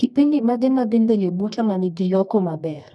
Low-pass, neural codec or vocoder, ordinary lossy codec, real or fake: none; codec, 24 kHz, 0.9 kbps, WavTokenizer, medium music audio release; none; fake